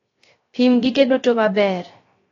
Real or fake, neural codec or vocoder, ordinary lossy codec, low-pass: fake; codec, 16 kHz, 0.3 kbps, FocalCodec; AAC, 32 kbps; 7.2 kHz